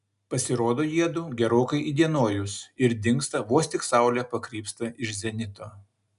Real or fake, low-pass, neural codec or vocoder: real; 10.8 kHz; none